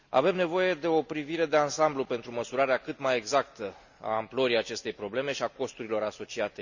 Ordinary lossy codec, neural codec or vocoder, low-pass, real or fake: none; none; 7.2 kHz; real